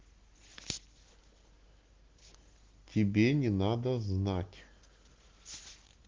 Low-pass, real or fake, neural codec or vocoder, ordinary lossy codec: 7.2 kHz; real; none; Opus, 16 kbps